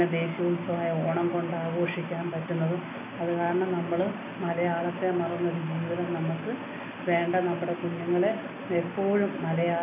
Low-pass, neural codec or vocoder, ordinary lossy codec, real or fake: 3.6 kHz; none; MP3, 32 kbps; real